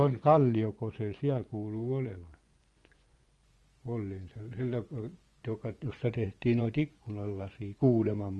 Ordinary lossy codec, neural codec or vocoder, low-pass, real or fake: Opus, 24 kbps; vocoder, 24 kHz, 100 mel bands, Vocos; 10.8 kHz; fake